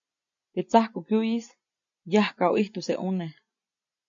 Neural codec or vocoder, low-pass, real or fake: none; 7.2 kHz; real